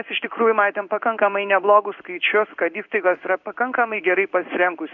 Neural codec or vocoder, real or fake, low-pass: codec, 16 kHz in and 24 kHz out, 1 kbps, XY-Tokenizer; fake; 7.2 kHz